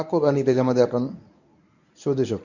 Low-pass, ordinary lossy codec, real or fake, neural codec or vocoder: 7.2 kHz; none; fake; codec, 24 kHz, 0.9 kbps, WavTokenizer, medium speech release version 1